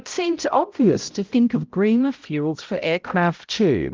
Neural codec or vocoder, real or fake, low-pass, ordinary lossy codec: codec, 16 kHz, 0.5 kbps, X-Codec, HuBERT features, trained on balanced general audio; fake; 7.2 kHz; Opus, 32 kbps